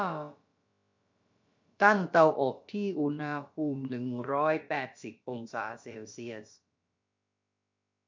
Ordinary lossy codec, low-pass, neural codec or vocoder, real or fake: MP3, 48 kbps; 7.2 kHz; codec, 16 kHz, about 1 kbps, DyCAST, with the encoder's durations; fake